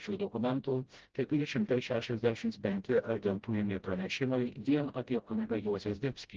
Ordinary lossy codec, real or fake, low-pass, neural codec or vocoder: Opus, 16 kbps; fake; 7.2 kHz; codec, 16 kHz, 0.5 kbps, FreqCodec, smaller model